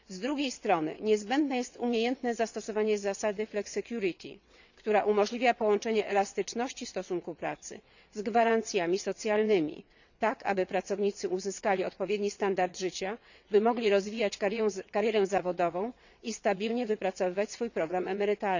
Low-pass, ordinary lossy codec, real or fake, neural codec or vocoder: 7.2 kHz; none; fake; vocoder, 22.05 kHz, 80 mel bands, WaveNeXt